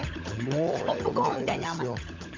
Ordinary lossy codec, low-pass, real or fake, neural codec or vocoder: MP3, 64 kbps; 7.2 kHz; fake; codec, 16 kHz, 16 kbps, FunCodec, trained on LibriTTS, 50 frames a second